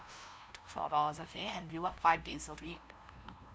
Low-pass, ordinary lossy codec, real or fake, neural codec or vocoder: none; none; fake; codec, 16 kHz, 0.5 kbps, FunCodec, trained on LibriTTS, 25 frames a second